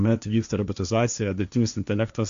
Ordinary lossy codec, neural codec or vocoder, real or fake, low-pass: MP3, 64 kbps; codec, 16 kHz, 1.1 kbps, Voila-Tokenizer; fake; 7.2 kHz